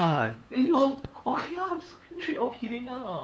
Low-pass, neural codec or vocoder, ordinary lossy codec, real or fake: none; codec, 16 kHz, 2 kbps, FunCodec, trained on LibriTTS, 25 frames a second; none; fake